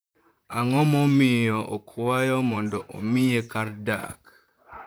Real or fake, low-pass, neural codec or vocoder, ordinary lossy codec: fake; none; vocoder, 44.1 kHz, 128 mel bands, Pupu-Vocoder; none